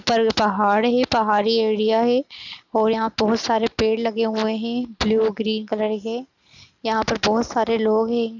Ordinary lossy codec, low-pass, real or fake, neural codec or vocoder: none; 7.2 kHz; real; none